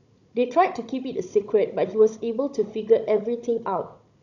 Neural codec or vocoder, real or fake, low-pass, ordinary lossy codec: codec, 16 kHz, 16 kbps, FunCodec, trained on Chinese and English, 50 frames a second; fake; 7.2 kHz; none